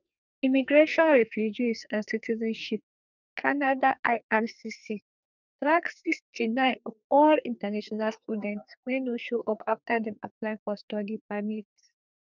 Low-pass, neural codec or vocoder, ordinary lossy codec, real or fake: 7.2 kHz; codec, 44.1 kHz, 2.6 kbps, SNAC; none; fake